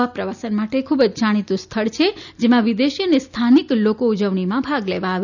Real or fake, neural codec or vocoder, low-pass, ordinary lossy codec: real; none; 7.2 kHz; none